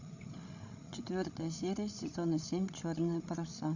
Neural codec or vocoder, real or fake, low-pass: codec, 16 kHz, 16 kbps, FreqCodec, larger model; fake; 7.2 kHz